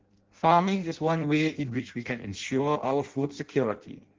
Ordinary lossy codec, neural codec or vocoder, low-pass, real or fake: Opus, 16 kbps; codec, 16 kHz in and 24 kHz out, 0.6 kbps, FireRedTTS-2 codec; 7.2 kHz; fake